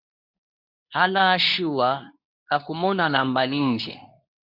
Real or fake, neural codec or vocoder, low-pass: fake; codec, 24 kHz, 0.9 kbps, WavTokenizer, medium speech release version 2; 5.4 kHz